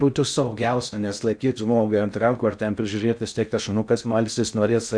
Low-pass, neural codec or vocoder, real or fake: 9.9 kHz; codec, 16 kHz in and 24 kHz out, 0.6 kbps, FocalCodec, streaming, 2048 codes; fake